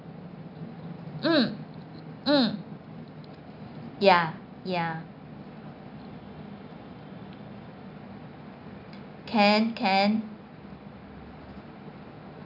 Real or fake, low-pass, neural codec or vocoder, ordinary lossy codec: real; 5.4 kHz; none; none